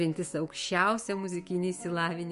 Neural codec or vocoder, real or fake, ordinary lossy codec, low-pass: autoencoder, 48 kHz, 128 numbers a frame, DAC-VAE, trained on Japanese speech; fake; MP3, 48 kbps; 14.4 kHz